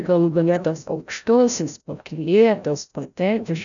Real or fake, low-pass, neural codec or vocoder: fake; 7.2 kHz; codec, 16 kHz, 0.5 kbps, FreqCodec, larger model